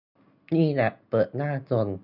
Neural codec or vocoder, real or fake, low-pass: none; real; 5.4 kHz